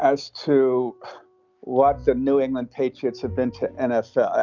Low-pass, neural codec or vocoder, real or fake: 7.2 kHz; none; real